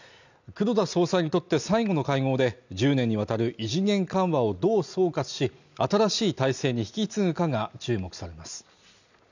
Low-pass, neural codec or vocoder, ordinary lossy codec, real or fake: 7.2 kHz; none; none; real